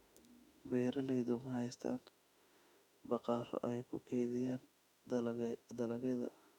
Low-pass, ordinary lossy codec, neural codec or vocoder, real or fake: 19.8 kHz; none; autoencoder, 48 kHz, 32 numbers a frame, DAC-VAE, trained on Japanese speech; fake